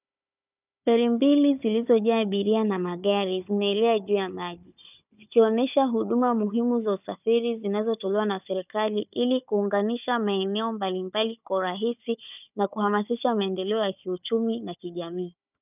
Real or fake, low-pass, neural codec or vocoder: fake; 3.6 kHz; codec, 16 kHz, 4 kbps, FunCodec, trained on Chinese and English, 50 frames a second